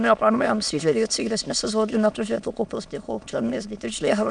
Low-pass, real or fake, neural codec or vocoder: 9.9 kHz; fake; autoencoder, 22.05 kHz, a latent of 192 numbers a frame, VITS, trained on many speakers